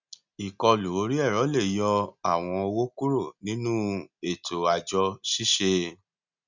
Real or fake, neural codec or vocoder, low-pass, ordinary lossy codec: real; none; 7.2 kHz; none